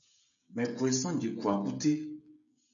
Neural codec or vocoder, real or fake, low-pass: codec, 16 kHz, 16 kbps, FreqCodec, smaller model; fake; 7.2 kHz